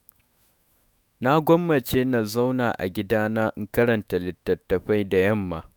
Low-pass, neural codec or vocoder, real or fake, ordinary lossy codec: none; autoencoder, 48 kHz, 128 numbers a frame, DAC-VAE, trained on Japanese speech; fake; none